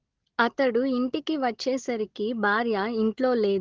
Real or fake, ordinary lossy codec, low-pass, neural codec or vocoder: real; Opus, 16 kbps; 7.2 kHz; none